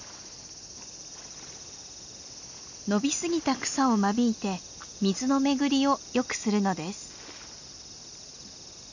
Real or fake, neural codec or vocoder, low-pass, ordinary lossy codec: real; none; 7.2 kHz; none